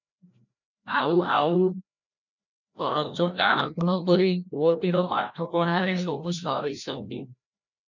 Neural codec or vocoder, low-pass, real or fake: codec, 16 kHz, 1 kbps, FreqCodec, larger model; 7.2 kHz; fake